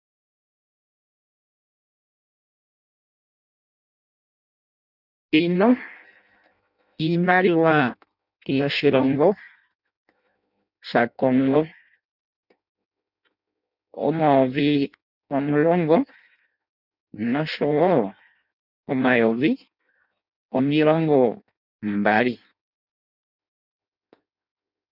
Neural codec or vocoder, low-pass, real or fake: codec, 16 kHz in and 24 kHz out, 0.6 kbps, FireRedTTS-2 codec; 5.4 kHz; fake